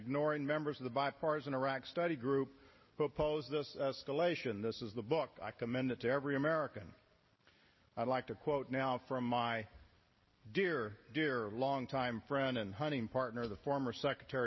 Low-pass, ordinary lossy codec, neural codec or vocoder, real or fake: 7.2 kHz; MP3, 24 kbps; none; real